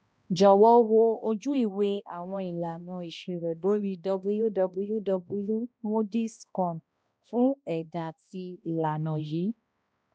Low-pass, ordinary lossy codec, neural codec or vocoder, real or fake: none; none; codec, 16 kHz, 1 kbps, X-Codec, HuBERT features, trained on balanced general audio; fake